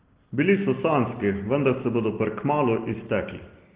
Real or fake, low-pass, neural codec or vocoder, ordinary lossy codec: real; 3.6 kHz; none; Opus, 16 kbps